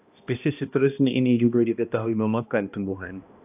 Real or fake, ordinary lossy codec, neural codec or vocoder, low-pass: fake; AAC, 32 kbps; codec, 16 kHz, 1 kbps, X-Codec, HuBERT features, trained on balanced general audio; 3.6 kHz